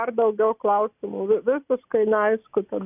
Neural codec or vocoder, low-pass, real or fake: none; 3.6 kHz; real